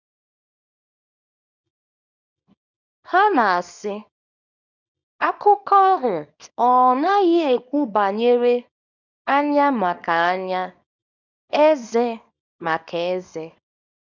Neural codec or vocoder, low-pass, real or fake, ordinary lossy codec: codec, 24 kHz, 0.9 kbps, WavTokenizer, small release; 7.2 kHz; fake; none